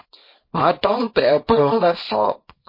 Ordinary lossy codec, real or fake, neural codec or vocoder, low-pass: MP3, 24 kbps; fake; codec, 24 kHz, 0.9 kbps, WavTokenizer, small release; 5.4 kHz